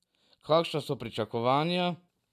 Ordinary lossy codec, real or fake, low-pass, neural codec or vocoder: none; real; 14.4 kHz; none